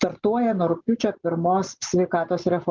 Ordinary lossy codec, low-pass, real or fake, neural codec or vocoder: Opus, 32 kbps; 7.2 kHz; real; none